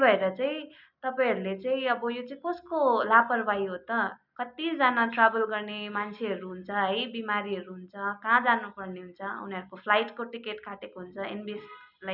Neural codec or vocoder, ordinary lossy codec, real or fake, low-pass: none; none; real; 5.4 kHz